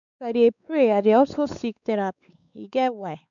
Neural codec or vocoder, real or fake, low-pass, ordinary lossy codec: codec, 16 kHz, 4 kbps, X-Codec, WavLM features, trained on Multilingual LibriSpeech; fake; 7.2 kHz; none